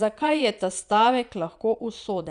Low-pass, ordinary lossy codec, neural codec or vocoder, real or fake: 9.9 kHz; none; vocoder, 22.05 kHz, 80 mel bands, Vocos; fake